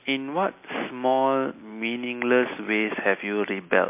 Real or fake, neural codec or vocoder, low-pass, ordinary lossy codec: real; none; 3.6 kHz; MP3, 24 kbps